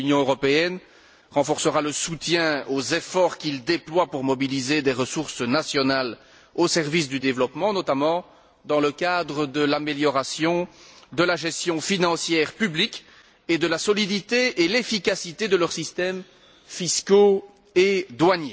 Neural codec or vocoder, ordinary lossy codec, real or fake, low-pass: none; none; real; none